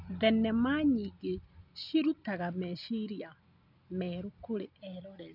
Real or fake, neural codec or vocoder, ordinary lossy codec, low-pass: real; none; none; 5.4 kHz